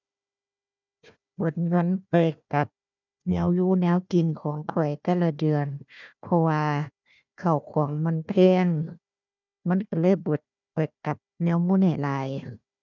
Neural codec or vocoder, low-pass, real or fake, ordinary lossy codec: codec, 16 kHz, 1 kbps, FunCodec, trained on Chinese and English, 50 frames a second; 7.2 kHz; fake; none